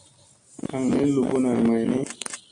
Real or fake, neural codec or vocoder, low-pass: real; none; 9.9 kHz